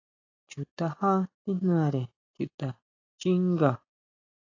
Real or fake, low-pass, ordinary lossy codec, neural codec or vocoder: real; 7.2 kHz; AAC, 32 kbps; none